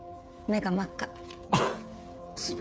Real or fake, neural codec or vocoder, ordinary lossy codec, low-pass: fake; codec, 16 kHz, 16 kbps, FreqCodec, smaller model; none; none